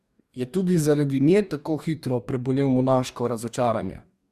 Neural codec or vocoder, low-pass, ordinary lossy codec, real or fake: codec, 44.1 kHz, 2.6 kbps, DAC; 14.4 kHz; Opus, 64 kbps; fake